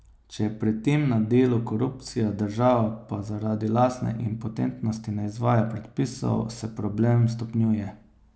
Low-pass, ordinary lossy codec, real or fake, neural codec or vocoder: none; none; real; none